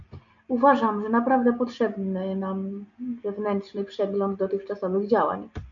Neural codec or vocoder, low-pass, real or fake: none; 7.2 kHz; real